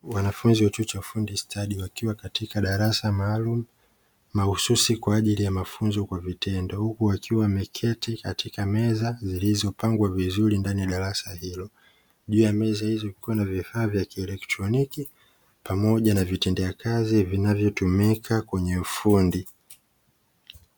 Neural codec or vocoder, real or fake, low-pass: none; real; 19.8 kHz